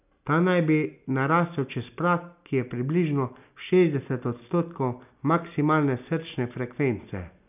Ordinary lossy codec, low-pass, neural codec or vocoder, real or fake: none; 3.6 kHz; none; real